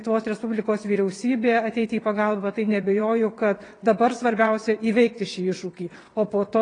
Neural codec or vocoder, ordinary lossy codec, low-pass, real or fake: vocoder, 22.05 kHz, 80 mel bands, WaveNeXt; AAC, 32 kbps; 9.9 kHz; fake